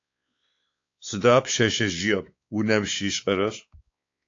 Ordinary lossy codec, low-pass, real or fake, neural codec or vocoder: AAC, 48 kbps; 7.2 kHz; fake; codec, 16 kHz, 4 kbps, X-Codec, WavLM features, trained on Multilingual LibriSpeech